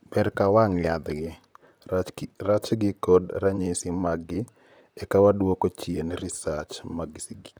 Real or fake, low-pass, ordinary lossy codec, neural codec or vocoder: fake; none; none; vocoder, 44.1 kHz, 128 mel bands, Pupu-Vocoder